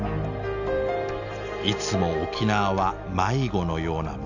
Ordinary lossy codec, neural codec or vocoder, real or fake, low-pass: none; none; real; 7.2 kHz